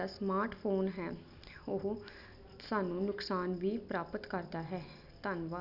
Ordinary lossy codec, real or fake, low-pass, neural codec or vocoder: none; real; 5.4 kHz; none